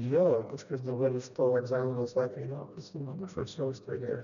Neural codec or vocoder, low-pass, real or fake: codec, 16 kHz, 1 kbps, FreqCodec, smaller model; 7.2 kHz; fake